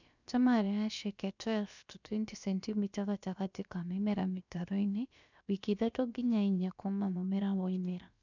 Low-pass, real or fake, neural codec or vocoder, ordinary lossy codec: 7.2 kHz; fake; codec, 16 kHz, about 1 kbps, DyCAST, with the encoder's durations; none